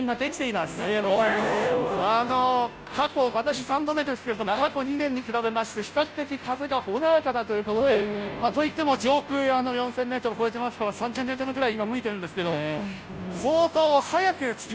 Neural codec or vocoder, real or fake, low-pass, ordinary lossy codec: codec, 16 kHz, 0.5 kbps, FunCodec, trained on Chinese and English, 25 frames a second; fake; none; none